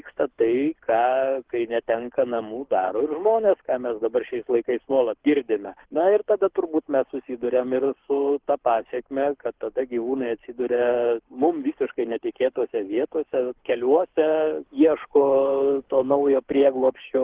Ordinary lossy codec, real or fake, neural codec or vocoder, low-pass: Opus, 16 kbps; fake; codec, 24 kHz, 6 kbps, HILCodec; 3.6 kHz